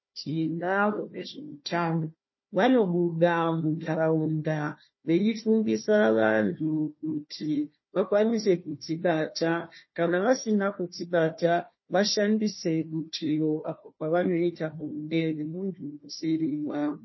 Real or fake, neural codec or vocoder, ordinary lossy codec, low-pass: fake; codec, 16 kHz, 1 kbps, FunCodec, trained on Chinese and English, 50 frames a second; MP3, 24 kbps; 7.2 kHz